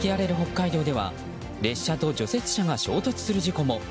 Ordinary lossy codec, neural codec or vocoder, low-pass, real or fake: none; none; none; real